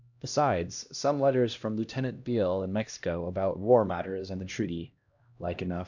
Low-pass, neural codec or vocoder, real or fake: 7.2 kHz; codec, 16 kHz, 1 kbps, X-Codec, HuBERT features, trained on LibriSpeech; fake